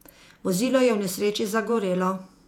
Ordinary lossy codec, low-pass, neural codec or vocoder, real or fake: none; 19.8 kHz; vocoder, 48 kHz, 128 mel bands, Vocos; fake